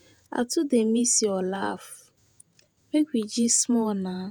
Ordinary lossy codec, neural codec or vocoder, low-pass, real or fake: none; vocoder, 48 kHz, 128 mel bands, Vocos; none; fake